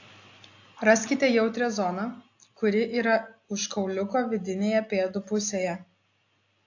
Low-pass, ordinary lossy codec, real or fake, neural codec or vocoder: 7.2 kHz; AAC, 48 kbps; real; none